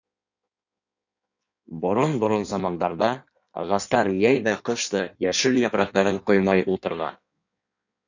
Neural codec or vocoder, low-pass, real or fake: codec, 16 kHz in and 24 kHz out, 1.1 kbps, FireRedTTS-2 codec; 7.2 kHz; fake